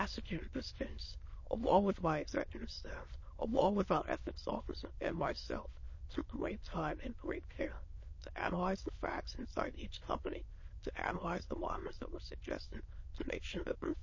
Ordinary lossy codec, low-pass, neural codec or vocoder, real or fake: MP3, 32 kbps; 7.2 kHz; autoencoder, 22.05 kHz, a latent of 192 numbers a frame, VITS, trained on many speakers; fake